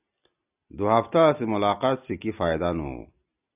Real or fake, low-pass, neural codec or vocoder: real; 3.6 kHz; none